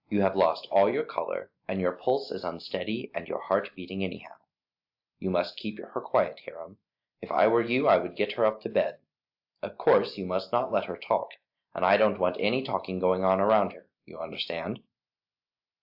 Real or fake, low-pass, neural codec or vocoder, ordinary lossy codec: real; 5.4 kHz; none; AAC, 48 kbps